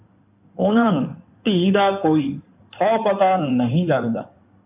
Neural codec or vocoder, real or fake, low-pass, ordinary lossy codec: codec, 16 kHz in and 24 kHz out, 2.2 kbps, FireRedTTS-2 codec; fake; 3.6 kHz; AAC, 32 kbps